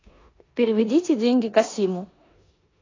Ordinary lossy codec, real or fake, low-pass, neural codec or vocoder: AAC, 32 kbps; fake; 7.2 kHz; codec, 16 kHz in and 24 kHz out, 0.9 kbps, LongCat-Audio-Codec, four codebook decoder